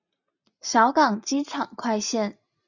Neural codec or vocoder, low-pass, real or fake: none; 7.2 kHz; real